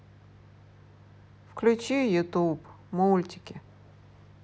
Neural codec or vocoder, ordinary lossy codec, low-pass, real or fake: none; none; none; real